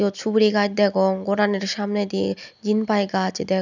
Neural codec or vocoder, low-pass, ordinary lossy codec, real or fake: none; 7.2 kHz; none; real